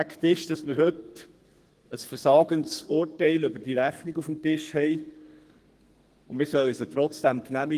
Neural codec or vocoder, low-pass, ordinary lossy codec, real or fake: codec, 32 kHz, 1.9 kbps, SNAC; 14.4 kHz; Opus, 24 kbps; fake